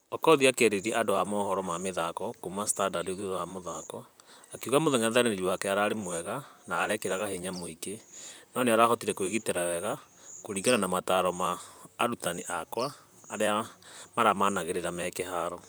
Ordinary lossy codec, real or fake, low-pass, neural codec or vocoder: none; fake; none; vocoder, 44.1 kHz, 128 mel bands, Pupu-Vocoder